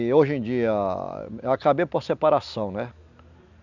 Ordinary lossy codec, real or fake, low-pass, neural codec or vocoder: none; real; 7.2 kHz; none